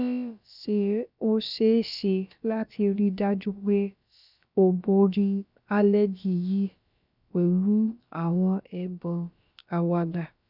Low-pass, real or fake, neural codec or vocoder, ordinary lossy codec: 5.4 kHz; fake; codec, 16 kHz, about 1 kbps, DyCAST, with the encoder's durations; none